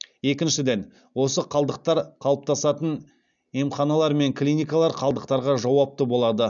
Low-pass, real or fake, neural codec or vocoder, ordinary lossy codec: 7.2 kHz; real; none; none